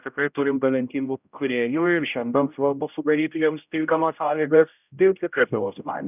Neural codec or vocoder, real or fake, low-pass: codec, 16 kHz, 0.5 kbps, X-Codec, HuBERT features, trained on general audio; fake; 3.6 kHz